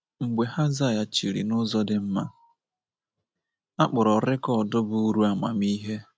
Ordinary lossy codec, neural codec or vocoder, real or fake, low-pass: none; none; real; none